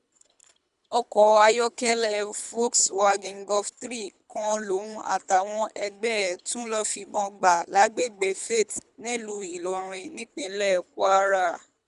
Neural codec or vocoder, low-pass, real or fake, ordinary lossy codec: codec, 24 kHz, 3 kbps, HILCodec; 10.8 kHz; fake; none